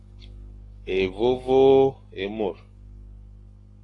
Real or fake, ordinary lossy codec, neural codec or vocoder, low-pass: fake; AAC, 32 kbps; codec, 44.1 kHz, 7.8 kbps, Pupu-Codec; 10.8 kHz